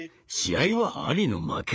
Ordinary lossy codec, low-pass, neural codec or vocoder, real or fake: none; none; codec, 16 kHz, 4 kbps, FreqCodec, larger model; fake